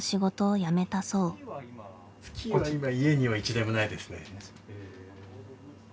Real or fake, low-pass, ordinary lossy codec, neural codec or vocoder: real; none; none; none